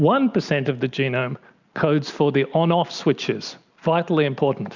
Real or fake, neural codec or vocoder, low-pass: real; none; 7.2 kHz